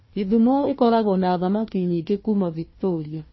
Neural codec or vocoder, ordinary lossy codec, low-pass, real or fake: codec, 16 kHz, 1 kbps, FunCodec, trained on Chinese and English, 50 frames a second; MP3, 24 kbps; 7.2 kHz; fake